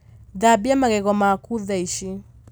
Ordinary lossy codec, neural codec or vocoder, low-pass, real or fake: none; none; none; real